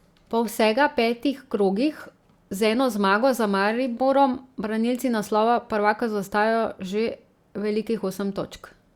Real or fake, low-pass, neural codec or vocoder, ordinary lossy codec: real; 19.8 kHz; none; Opus, 64 kbps